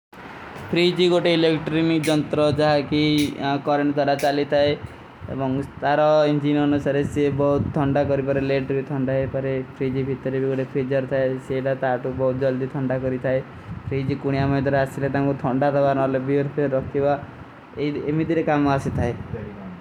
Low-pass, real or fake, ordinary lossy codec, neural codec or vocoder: 19.8 kHz; real; none; none